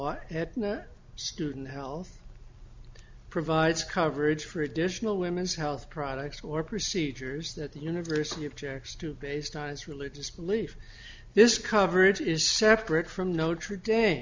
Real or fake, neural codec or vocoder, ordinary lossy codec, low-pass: real; none; MP3, 64 kbps; 7.2 kHz